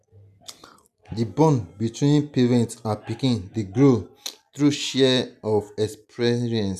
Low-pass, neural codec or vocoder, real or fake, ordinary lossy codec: 14.4 kHz; none; real; none